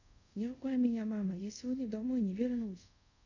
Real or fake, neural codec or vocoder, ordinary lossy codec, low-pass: fake; codec, 24 kHz, 0.5 kbps, DualCodec; none; 7.2 kHz